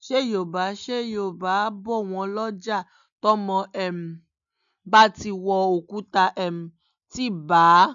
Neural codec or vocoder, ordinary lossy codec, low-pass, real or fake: none; none; 7.2 kHz; real